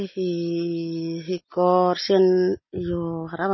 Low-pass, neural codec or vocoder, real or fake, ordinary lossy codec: 7.2 kHz; none; real; MP3, 24 kbps